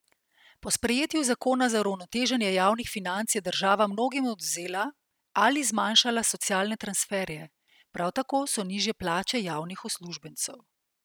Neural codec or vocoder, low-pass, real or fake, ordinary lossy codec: none; none; real; none